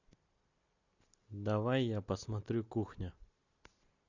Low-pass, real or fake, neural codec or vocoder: 7.2 kHz; real; none